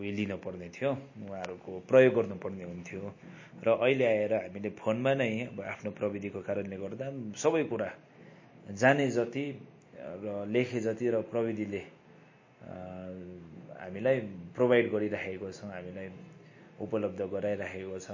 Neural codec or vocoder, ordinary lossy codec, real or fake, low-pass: none; MP3, 32 kbps; real; 7.2 kHz